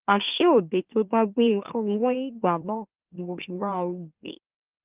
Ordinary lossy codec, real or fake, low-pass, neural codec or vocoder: Opus, 24 kbps; fake; 3.6 kHz; autoencoder, 44.1 kHz, a latent of 192 numbers a frame, MeloTTS